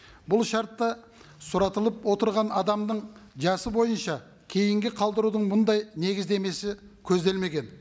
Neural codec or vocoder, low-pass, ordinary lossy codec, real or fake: none; none; none; real